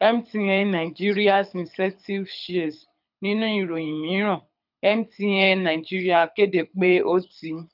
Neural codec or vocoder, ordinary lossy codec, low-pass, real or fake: codec, 24 kHz, 6 kbps, HILCodec; none; 5.4 kHz; fake